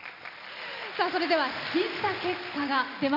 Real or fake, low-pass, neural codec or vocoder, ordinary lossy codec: real; 5.4 kHz; none; none